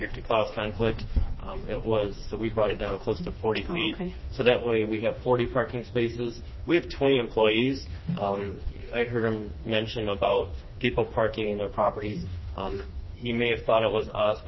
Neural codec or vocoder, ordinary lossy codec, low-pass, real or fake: codec, 16 kHz, 2 kbps, FreqCodec, smaller model; MP3, 24 kbps; 7.2 kHz; fake